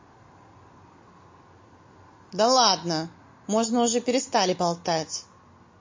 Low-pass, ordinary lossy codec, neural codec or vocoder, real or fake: 7.2 kHz; MP3, 32 kbps; none; real